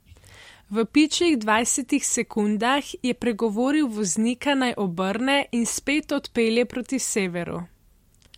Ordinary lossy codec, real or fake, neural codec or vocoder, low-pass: MP3, 64 kbps; real; none; 19.8 kHz